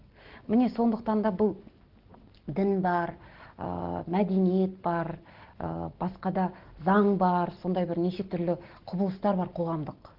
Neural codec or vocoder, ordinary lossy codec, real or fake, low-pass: none; Opus, 16 kbps; real; 5.4 kHz